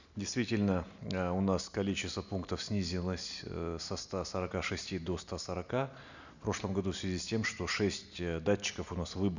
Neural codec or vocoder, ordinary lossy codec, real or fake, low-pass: none; none; real; 7.2 kHz